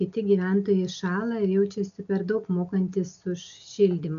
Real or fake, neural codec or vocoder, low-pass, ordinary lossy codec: real; none; 7.2 kHz; MP3, 96 kbps